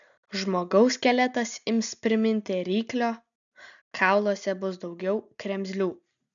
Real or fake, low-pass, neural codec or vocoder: real; 7.2 kHz; none